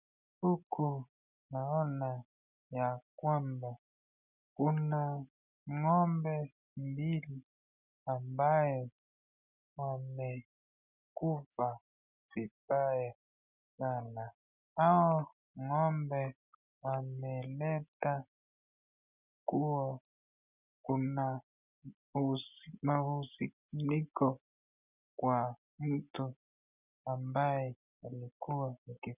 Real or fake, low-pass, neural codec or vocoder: real; 3.6 kHz; none